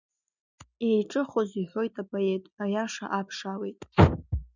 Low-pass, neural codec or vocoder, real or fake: 7.2 kHz; none; real